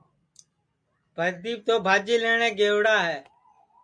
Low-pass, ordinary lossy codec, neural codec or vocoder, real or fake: 9.9 kHz; MP3, 96 kbps; none; real